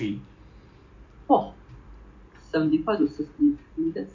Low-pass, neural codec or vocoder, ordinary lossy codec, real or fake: 7.2 kHz; vocoder, 44.1 kHz, 128 mel bands every 256 samples, BigVGAN v2; MP3, 48 kbps; fake